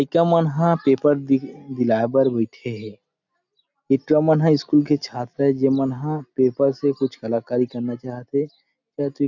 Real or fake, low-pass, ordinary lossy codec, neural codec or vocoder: real; 7.2 kHz; none; none